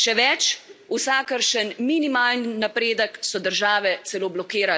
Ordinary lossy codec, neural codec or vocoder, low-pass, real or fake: none; none; none; real